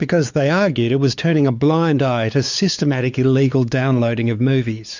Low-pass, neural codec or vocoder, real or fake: 7.2 kHz; codec, 16 kHz, 4 kbps, X-Codec, WavLM features, trained on Multilingual LibriSpeech; fake